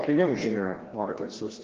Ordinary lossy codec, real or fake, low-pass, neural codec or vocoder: Opus, 16 kbps; fake; 7.2 kHz; codec, 16 kHz, 1 kbps, FreqCodec, larger model